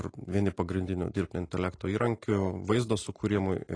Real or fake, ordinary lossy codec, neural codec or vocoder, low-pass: real; AAC, 32 kbps; none; 9.9 kHz